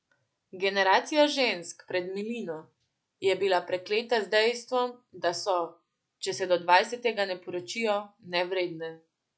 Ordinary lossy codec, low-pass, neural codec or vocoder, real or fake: none; none; none; real